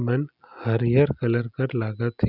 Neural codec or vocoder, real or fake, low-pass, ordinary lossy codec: none; real; 5.4 kHz; none